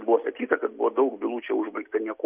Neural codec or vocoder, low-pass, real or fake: none; 3.6 kHz; real